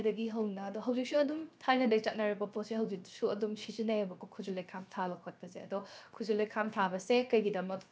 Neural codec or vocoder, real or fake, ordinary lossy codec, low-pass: codec, 16 kHz, 0.7 kbps, FocalCodec; fake; none; none